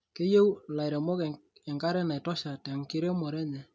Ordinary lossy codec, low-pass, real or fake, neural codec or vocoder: none; none; real; none